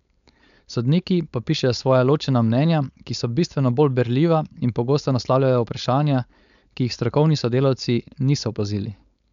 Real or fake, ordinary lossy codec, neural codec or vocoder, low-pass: fake; none; codec, 16 kHz, 4.8 kbps, FACodec; 7.2 kHz